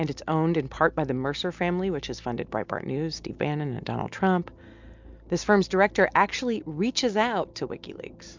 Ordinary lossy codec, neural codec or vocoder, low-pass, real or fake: MP3, 64 kbps; none; 7.2 kHz; real